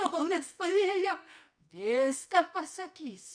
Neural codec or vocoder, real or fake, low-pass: codec, 24 kHz, 0.9 kbps, WavTokenizer, medium music audio release; fake; 9.9 kHz